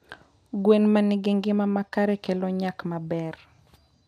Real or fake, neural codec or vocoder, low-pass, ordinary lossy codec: real; none; 14.4 kHz; none